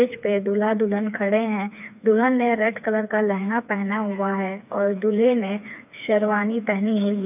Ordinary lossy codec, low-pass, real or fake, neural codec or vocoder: none; 3.6 kHz; fake; codec, 16 kHz, 4 kbps, FreqCodec, smaller model